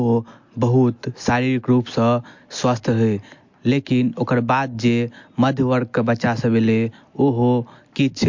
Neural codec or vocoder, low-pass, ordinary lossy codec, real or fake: none; 7.2 kHz; MP3, 48 kbps; real